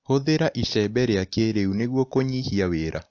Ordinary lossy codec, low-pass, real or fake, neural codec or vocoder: AAC, 48 kbps; 7.2 kHz; real; none